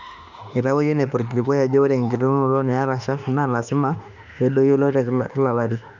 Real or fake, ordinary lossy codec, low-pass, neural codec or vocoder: fake; none; 7.2 kHz; autoencoder, 48 kHz, 32 numbers a frame, DAC-VAE, trained on Japanese speech